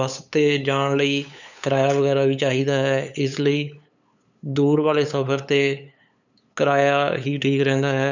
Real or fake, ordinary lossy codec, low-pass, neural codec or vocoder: fake; none; 7.2 kHz; codec, 16 kHz, 8 kbps, FunCodec, trained on LibriTTS, 25 frames a second